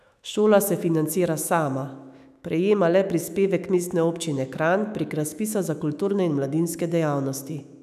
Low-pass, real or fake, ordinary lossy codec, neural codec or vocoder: 14.4 kHz; fake; none; autoencoder, 48 kHz, 128 numbers a frame, DAC-VAE, trained on Japanese speech